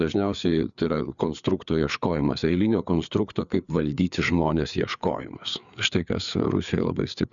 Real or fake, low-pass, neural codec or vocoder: fake; 7.2 kHz; codec, 16 kHz, 4 kbps, FreqCodec, larger model